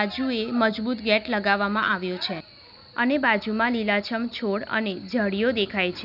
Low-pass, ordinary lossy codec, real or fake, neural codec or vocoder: 5.4 kHz; none; real; none